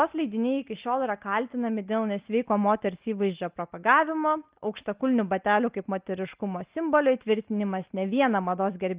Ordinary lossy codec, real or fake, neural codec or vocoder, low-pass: Opus, 32 kbps; real; none; 3.6 kHz